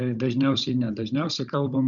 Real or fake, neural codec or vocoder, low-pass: fake; codec, 16 kHz, 16 kbps, FunCodec, trained on Chinese and English, 50 frames a second; 7.2 kHz